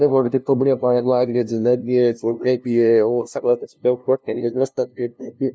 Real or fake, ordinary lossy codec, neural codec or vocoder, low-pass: fake; none; codec, 16 kHz, 0.5 kbps, FunCodec, trained on LibriTTS, 25 frames a second; none